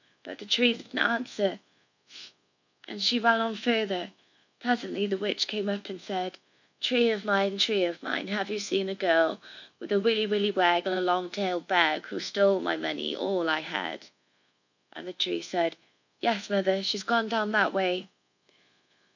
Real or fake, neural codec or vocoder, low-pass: fake; codec, 24 kHz, 1.2 kbps, DualCodec; 7.2 kHz